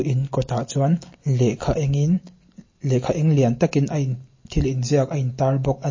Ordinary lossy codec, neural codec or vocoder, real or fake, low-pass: MP3, 32 kbps; none; real; 7.2 kHz